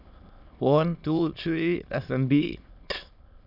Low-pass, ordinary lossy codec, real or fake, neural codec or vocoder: 5.4 kHz; none; fake; autoencoder, 22.05 kHz, a latent of 192 numbers a frame, VITS, trained on many speakers